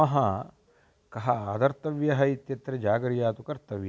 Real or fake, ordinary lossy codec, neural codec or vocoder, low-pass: real; none; none; none